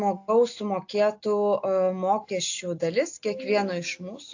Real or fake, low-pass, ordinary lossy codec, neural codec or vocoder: real; 7.2 kHz; AAC, 48 kbps; none